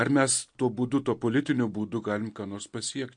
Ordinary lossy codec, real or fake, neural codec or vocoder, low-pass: MP3, 48 kbps; real; none; 9.9 kHz